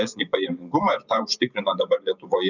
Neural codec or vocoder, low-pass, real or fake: vocoder, 24 kHz, 100 mel bands, Vocos; 7.2 kHz; fake